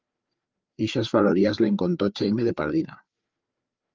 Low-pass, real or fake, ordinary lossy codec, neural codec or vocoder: 7.2 kHz; fake; Opus, 32 kbps; codec, 16 kHz, 4 kbps, FreqCodec, larger model